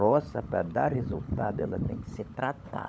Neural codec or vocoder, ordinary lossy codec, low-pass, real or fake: codec, 16 kHz, 16 kbps, FunCodec, trained on LibriTTS, 50 frames a second; none; none; fake